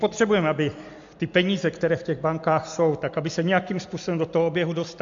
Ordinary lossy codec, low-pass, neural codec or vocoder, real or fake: AAC, 64 kbps; 7.2 kHz; none; real